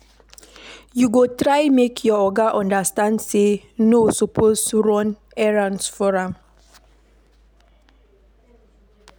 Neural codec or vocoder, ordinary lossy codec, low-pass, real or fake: none; none; none; real